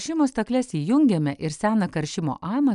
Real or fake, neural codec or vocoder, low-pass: real; none; 10.8 kHz